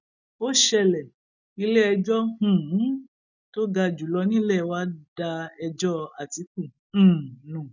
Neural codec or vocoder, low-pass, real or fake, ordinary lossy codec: none; 7.2 kHz; real; none